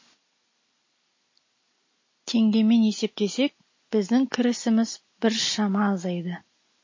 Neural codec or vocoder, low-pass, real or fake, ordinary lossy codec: none; 7.2 kHz; real; MP3, 32 kbps